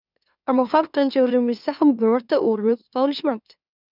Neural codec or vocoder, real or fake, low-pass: autoencoder, 44.1 kHz, a latent of 192 numbers a frame, MeloTTS; fake; 5.4 kHz